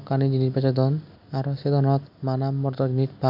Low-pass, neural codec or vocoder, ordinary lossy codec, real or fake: 5.4 kHz; none; none; real